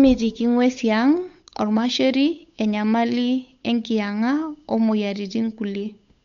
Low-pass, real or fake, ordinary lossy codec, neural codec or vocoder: 7.2 kHz; fake; MP3, 64 kbps; codec, 16 kHz, 8 kbps, FunCodec, trained on Chinese and English, 25 frames a second